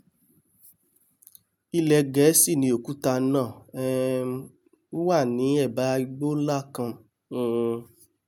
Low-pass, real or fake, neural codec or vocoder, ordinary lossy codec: none; real; none; none